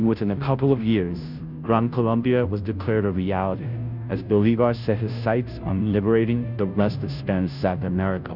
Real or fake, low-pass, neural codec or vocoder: fake; 5.4 kHz; codec, 16 kHz, 0.5 kbps, FunCodec, trained on Chinese and English, 25 frames a second